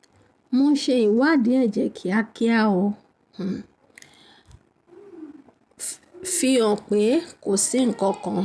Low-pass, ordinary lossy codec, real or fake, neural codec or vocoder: none; none; real; none